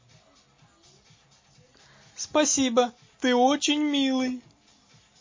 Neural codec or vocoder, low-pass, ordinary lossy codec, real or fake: none; 7.2 kHz; MP3, 32 kbps; real